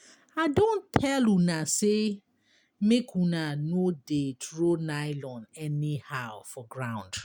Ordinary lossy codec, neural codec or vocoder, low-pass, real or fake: none; none; none; real